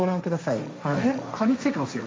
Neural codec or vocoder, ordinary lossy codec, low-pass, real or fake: codec, 16 kHz, 1.1 kbps, Voila-Tokenizer; none; none; fake